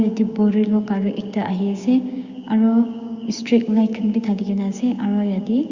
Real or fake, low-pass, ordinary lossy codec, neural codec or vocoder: fake; 7.2 kHz; Opus, 64 kbps; codec, 16 kHz, 6 kbps, DAC